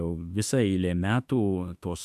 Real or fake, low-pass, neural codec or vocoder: fake; 14.4 kHz; autoencoder, 48 kHz, 32 numbers a frame, DAC-VAE, trained on Japanese speech